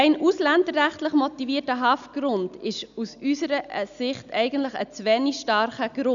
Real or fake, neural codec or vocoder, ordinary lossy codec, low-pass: real; none; none; 7.2 kHz